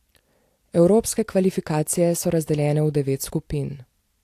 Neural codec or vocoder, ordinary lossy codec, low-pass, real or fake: vocoder, 44.1 kHz, 128 mel bands every 256 samples, BigVGAN v2; AAC, 64 kbps; 14.4 kHz; fake